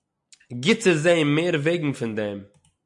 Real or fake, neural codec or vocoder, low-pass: real; none; 10.8 kHz